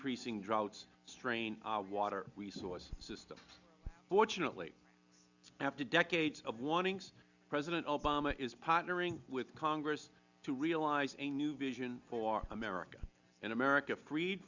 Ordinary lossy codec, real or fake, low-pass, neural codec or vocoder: Opus, 64 kbps; real; 7.2 kHz; none